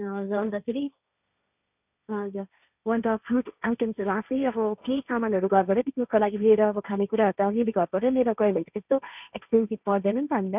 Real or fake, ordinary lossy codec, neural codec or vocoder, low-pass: fake; none; codec, 16 kHz, 1.1 kbps, Voila-Tokenizer; 3.6 kHz